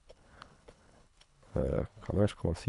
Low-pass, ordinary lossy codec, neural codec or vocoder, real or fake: 10.8 kHz; none; codec, 24 kHz, 3 kbps, HILCodec; fake